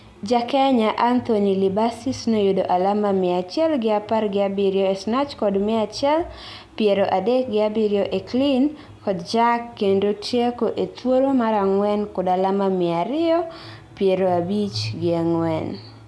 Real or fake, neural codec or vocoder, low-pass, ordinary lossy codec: real; none; none; none